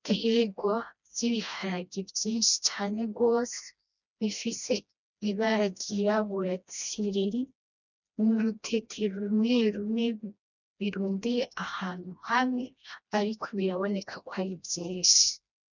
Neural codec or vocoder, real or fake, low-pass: codec, 16 kHz, 1 kbps, FreqCodec, smaller model; fake; 7.2 kHz